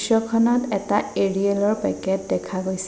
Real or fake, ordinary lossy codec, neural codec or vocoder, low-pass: real; none; none; none